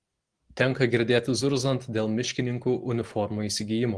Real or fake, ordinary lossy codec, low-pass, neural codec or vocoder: real; Opus, 16 kbps; 9.9 kHz; none